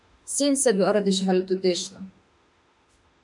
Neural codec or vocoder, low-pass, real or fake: autoencoder, 48 kHz, 32 numbers a frame, DAC-VAE, trained on Japanese speech; 10.8 kHz; fake